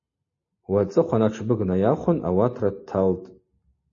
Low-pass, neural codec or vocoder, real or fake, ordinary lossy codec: 7.2 kHz; none; real; MP3, 32 kbps